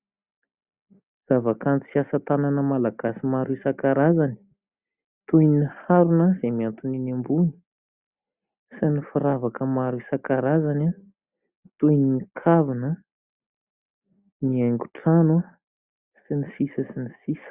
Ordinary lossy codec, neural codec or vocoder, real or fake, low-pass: Opus, 64 kbps; none; real; 3.6 kHz